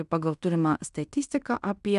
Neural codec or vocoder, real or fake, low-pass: codec, 16 kHz in and 24 kHz out, 0.9 kbps, LongCat-Audio-Codec, fine tuned four codebook decoder; fake; 10.8 kHz